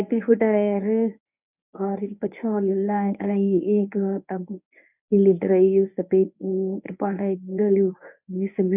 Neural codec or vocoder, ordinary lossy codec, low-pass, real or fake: codec, 24 kHz, 0.9 kbps, WavTokenizer, medium speech release version 1; none; 3.6 kHz; fake